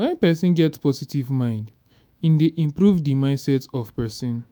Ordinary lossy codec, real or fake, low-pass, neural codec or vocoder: none; fake; none; autoencoder, 48 kHz, 128 numbers a frame, DAC-VAE, trained on Japanese speech